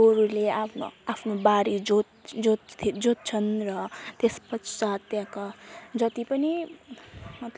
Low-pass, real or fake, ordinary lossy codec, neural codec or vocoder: none; real; none; none